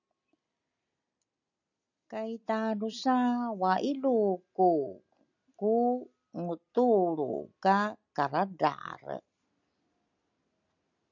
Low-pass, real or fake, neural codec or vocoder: 7.2 kHz; real; none